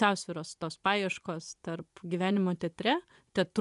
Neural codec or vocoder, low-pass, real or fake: vocoder, 24 kHz, 100 mel bands, Vocos; 10.8 kHz; fake